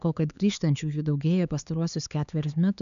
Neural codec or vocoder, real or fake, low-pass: codec, 16 kHz, 4 kbps, X-Codec, HuBERT features, trained on LibriSpeech; fake; 7.2 kHz